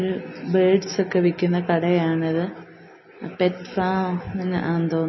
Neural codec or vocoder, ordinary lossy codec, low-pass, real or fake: none; MP3, 24 kbps; 7.2 kHz; real